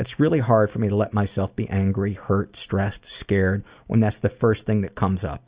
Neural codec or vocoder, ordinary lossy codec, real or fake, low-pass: none; Opus, 64 kbps; real; 3.6 kHz